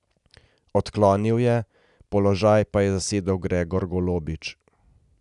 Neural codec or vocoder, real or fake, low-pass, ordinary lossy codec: none; real; 10.8 kHz; none